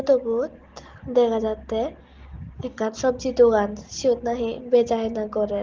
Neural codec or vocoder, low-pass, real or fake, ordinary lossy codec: none; 7.2 kHz; real; Opus, 16 kbps